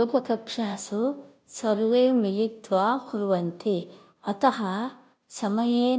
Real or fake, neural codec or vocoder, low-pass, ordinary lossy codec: fake; codec, 16 kHz, 0.5 kbps, FunCodec, trained on Chinese and English, 25 frames a second; none; none